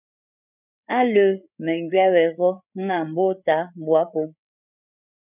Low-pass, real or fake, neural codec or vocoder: 3.6 kHz; fake; codec, 16 kHz, 8 kbps, FreqCodec, larger model